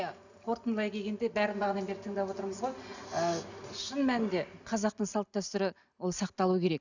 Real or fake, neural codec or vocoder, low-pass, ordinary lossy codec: real; none; 7.2 kHz; none